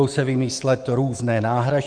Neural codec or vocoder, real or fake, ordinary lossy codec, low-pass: autoencoder, 48 kHz, 128 numbers a frame, DAC-VAE, trained on Japanese speech; fake; Opus, 24 kbps; 9.9 kHz